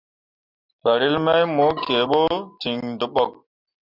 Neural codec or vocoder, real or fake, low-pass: none; real; 5.4 kHz